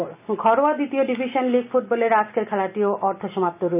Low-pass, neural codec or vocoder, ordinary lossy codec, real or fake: 3.6 kHz; none; none; real